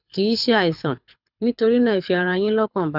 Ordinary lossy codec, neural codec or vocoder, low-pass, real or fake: none; codec, 24 kHz, 6 kbps, HILCodec; 5.4 kHz; fake